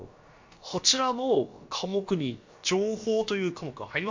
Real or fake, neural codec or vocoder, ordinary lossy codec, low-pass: fake; codec, 16 kHz, about 1 kbps, DyCAST, with the encoder's durations; MP3, 48 kbps; 7.2 kHz